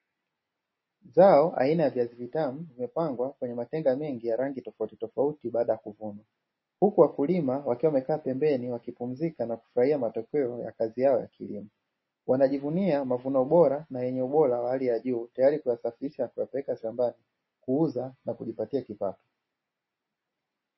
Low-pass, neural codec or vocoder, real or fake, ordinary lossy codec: 7.2 kHz; none; real; MP3, 24 kbps